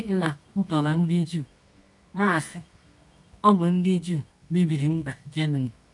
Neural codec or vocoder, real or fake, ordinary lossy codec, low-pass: codec, 24 kHz, 0.9 kbps, WavTokenizer, medium music audio release; fake; none; 10.8 kHz